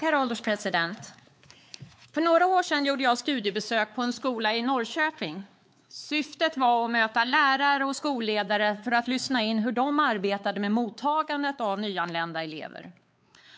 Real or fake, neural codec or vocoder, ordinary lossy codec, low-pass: fake; codec, 16 kHz, 4 kbps, X-Codec, WavLM features, trained on Multilingual LibriSpeech; none; none